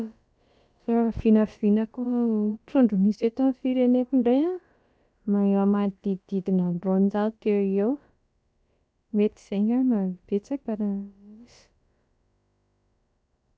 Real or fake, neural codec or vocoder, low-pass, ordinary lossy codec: fake; codec, 16 kHz, about 1 kbps, DyCAST, with the encoder's durations; none; none